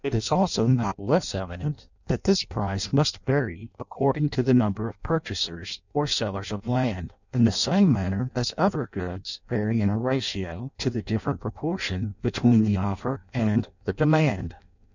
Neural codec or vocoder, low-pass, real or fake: codec, 16 kHz in and 24 kHz out, 0.6 kbps, FireRedTTS-2 codec; 7.2 kHz; fake